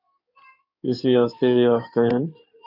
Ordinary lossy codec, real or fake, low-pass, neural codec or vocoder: MP3, 48 kbps; fake; 5.4 kHz; codec, 16 kHz in and 24 kHz out, 2.2 kbps, FireRedTTS-2 codec